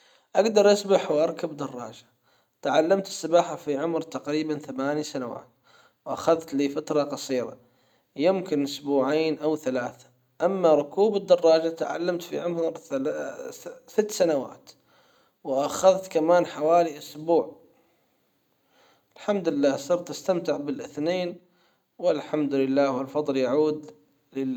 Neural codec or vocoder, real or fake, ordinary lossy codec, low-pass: none; real; none; 19.8 kHz